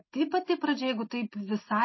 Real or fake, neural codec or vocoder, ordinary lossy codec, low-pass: real; none; MP3, 24 kbps; 7.2 kHz